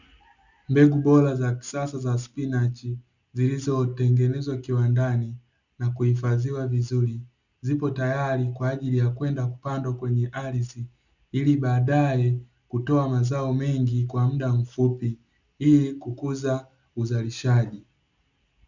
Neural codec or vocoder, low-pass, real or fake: none; 7.2 kHz; real